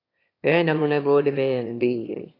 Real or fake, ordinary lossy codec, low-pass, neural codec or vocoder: fake; AAC, 24 kbps; 5.4 kHz; autoencoder, 22.05 kHz, a latent of 192 numbers a frame, VITS, trained on one speaker